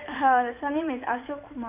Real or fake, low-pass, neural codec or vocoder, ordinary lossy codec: fake; 3.6 kHz; codec, 16 kHz in and 24 kHz out, 2.2 kbps, FireRedTTS-2 codec; none